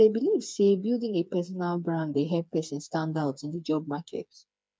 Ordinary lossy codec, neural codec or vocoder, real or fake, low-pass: none; codec, 16 kHz, 4 kbps, FreqCodec, smaller model; fake; none